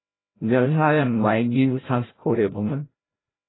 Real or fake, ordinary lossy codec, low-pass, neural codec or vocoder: fake; AAC, 16 kbps; 7.2 kHz; codec, 16 kHz, 0.5 kbps, FreqCodec, larger model